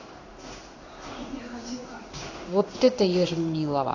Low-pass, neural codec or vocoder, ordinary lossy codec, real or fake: 7.2 kHz; codec, 16 kHz in and 24 kHz out, 1 kbps, XY-Tokenizer; AAC, 48 kbps; fake